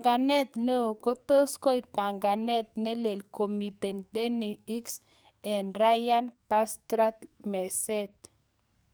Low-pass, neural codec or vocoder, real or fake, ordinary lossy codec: none; codec, 44.1 kHz, 2.6 kbps, SNAC; fake; none